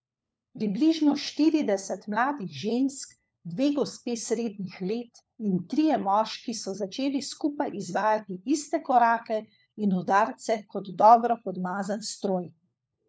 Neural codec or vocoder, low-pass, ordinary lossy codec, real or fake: codec, 16 kHz, 4 kbps, FunCodec, trained on LibriTTS, 50 frames a second; none; none; fake